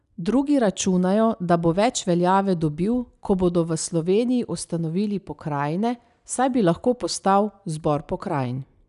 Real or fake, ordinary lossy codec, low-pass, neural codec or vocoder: real; none; 10.8 kHz; none